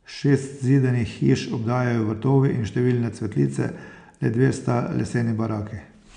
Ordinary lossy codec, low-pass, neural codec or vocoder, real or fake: none; 9.9 kHz; none; real